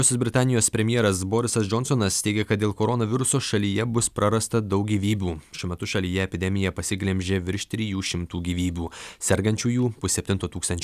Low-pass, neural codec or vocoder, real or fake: 14.4 kHz; none; real